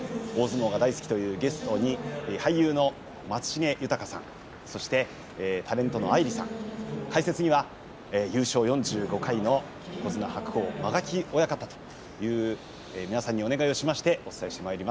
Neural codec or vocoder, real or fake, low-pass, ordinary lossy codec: none; real; none; none